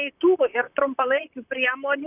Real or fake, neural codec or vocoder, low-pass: fake; vocoder, 44.1 kHz, 128 mel bands, Pupu-Vocoder; 3.6 kHz